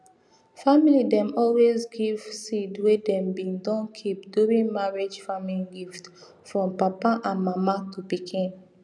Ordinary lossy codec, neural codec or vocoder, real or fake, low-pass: none; none; real; none